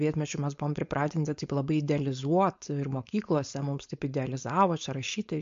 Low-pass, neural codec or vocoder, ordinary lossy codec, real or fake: 7.2 kHz; codec, 16 kHz, 4.8 kbps, FACodec; MP3, 48 kbps; fake